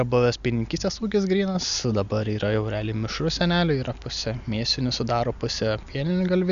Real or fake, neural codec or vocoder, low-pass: real; none; 7.2 kHz